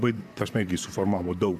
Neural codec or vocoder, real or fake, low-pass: vocoder, 44.1 kHz, 128 mel bands, Pupu-Vocoder; fake; 14.4 kHz